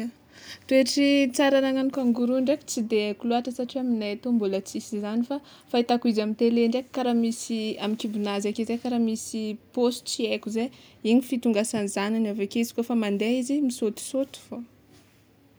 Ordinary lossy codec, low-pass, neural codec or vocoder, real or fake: none; none; none; real